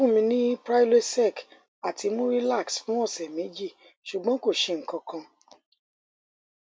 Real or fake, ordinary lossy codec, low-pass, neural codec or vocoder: real; none; none; none